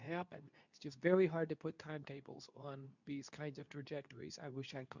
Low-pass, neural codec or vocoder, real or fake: 7.2 kHz; codec, 24 kHz, 0.9 kbps, WavTokenizer, medium speech release version 2; fake